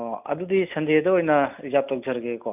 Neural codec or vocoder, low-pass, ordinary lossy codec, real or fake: none; 3.6 kHz; none; real